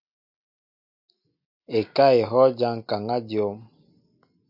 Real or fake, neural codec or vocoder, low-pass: real; none; 5.4 kHz